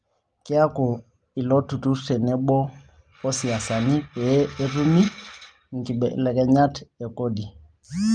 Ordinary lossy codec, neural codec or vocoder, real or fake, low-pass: Opus, 32 kbps; none; real; 9.9 kHz